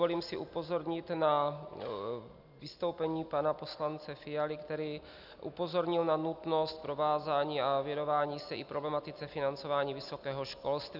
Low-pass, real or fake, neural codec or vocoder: 5.4 kHz; real; none